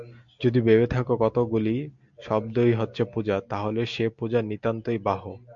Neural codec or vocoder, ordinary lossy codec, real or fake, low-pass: none; MP3, 64 kbps; real; 7.2 kHz